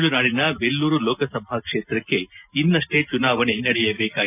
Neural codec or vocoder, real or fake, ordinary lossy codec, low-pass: none; real; none; 3.6 kHz